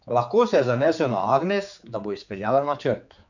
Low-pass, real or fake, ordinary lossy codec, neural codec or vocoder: 7.2 kHz; fake; none; codec, 16 kHz, 4 kbps, X-Codec, HuBERT features, trained on general audio